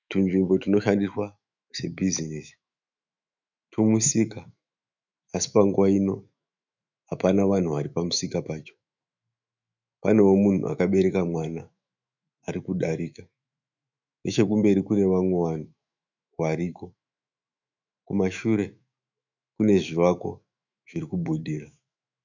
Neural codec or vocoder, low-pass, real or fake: autoencoder, 48 kHz, 128 numbers a frame, DAC-VAE, trained on Japanese speech; 7.2 kHz; fake